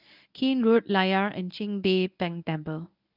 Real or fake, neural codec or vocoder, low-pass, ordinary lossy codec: fake; codec, 24 kHz, 0.9 kbps, WavTokenizer, medium speech release version 1; 5.4 kHz; none